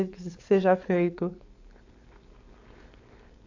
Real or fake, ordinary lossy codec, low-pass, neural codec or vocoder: fake; AAC, 48 kbps; 7.2 kHz; codec, 16 kHz, 4.8 kbps, FACodec